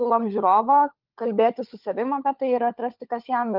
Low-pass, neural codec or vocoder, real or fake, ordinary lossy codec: 5.4 kHz; codec, 16 kHz, 16 kbps, FunCodec, trained on LibriTTS, 50 frames a second; fake; Opus, 24 kbps